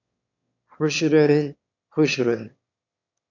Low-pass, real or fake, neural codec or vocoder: 7.2 kHz; fake; autoencoder, 22.05 kHz, a latent of 192 numbers a frame, VITS, trained on one speaker